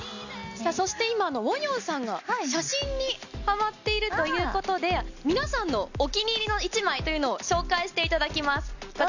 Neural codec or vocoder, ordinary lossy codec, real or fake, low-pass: none; none; real; 7.2 kHz